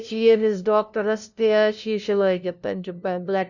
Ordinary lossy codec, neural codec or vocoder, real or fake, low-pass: none; codec, 16 kHz, 0.5 kbps, FunCodec, trained on LibriTTS, 25 frames a second; fake; 7.2 kHz